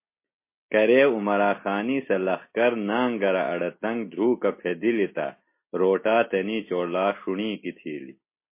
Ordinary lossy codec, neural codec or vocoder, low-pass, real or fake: MP3, 24 kbps; none; 3.6 kHz; real